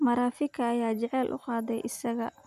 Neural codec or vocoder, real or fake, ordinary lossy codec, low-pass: none; real; none; 14.4 kHz